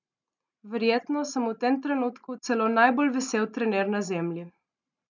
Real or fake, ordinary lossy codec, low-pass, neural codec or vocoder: real; none; 7.2 kHz; none